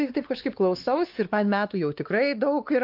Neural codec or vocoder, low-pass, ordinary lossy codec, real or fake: codec, 16 kHz, 4 kbps, X-Codec, WavLM features, trained on Multilingual LibriSpeech; 5.4 kHz; Opus, 32 kbps; fake